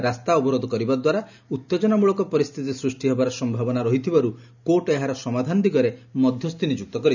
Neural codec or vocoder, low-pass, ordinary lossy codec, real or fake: none; 7.2 kHz; none; real